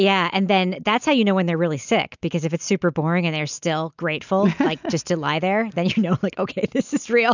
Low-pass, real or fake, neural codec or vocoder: 7.2 kHz; real; none